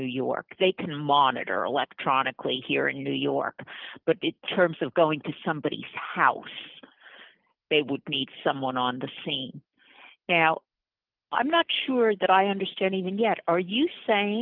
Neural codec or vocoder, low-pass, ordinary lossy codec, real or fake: none; 5.4 kHz; Opus, 32 kbps; real